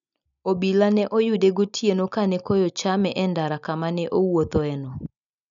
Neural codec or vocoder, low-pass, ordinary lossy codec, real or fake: none; 7.2 kHz; none; real